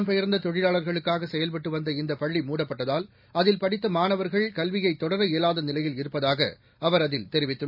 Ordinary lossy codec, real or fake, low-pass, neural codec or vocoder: none; real; 5.4 kHz; none